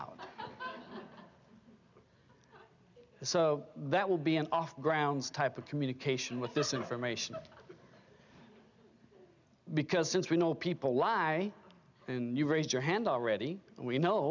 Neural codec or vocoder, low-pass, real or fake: none; 7.2 kHz; real